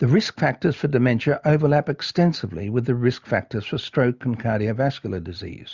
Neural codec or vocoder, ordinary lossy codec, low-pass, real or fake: none; Opus, 64 kbps; 7.2 kHz; real